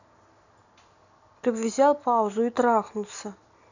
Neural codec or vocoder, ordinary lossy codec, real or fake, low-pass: none; none; real; 7.2 kHz